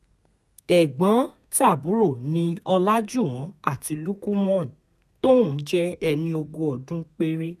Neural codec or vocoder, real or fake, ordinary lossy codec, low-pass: codec, 32 kHz, 1.9 kbps, SNAC; fake; none; 14.4 kHz